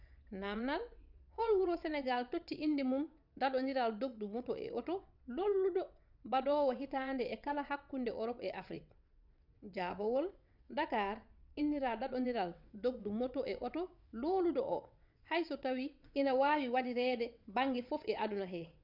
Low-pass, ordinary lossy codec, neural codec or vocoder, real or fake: 5.4 kHz; none; vocoder, 22.05 kHz, 80 mel bands, WaveNeXt; fake